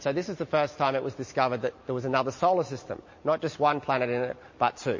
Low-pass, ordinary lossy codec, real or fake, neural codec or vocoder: 7.2 kHz; MP3, 32 kbps; real; none